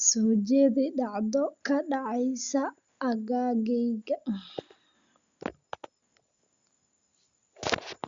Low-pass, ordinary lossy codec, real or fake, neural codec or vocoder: 7.2 kHz; Opus, 64 kbps; real; none